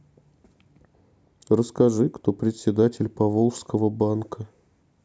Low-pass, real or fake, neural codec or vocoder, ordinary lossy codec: none; real; none; none